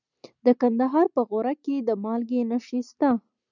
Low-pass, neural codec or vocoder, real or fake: 7.2 kHz; none; real